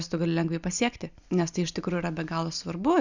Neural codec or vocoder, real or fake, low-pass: none; real; 7.2 kHz